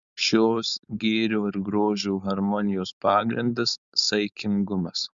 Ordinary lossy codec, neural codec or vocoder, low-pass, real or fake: Opus, 64 kbps; codec, 16 kHz, 4.8 kbps, FACodec; 7.2 kHz; fake